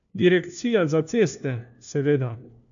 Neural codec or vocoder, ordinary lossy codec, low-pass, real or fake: codec, 16 kHz, 1 kbps, FunCodec, trained on LibriTTS, 50 frames a second; none; 7.2 kHz; fake